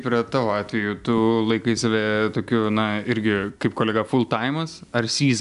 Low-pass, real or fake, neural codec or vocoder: 10.8 kHz; real; none